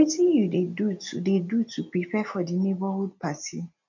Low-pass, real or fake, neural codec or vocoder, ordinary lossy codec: 7.2 kHz; real; none; none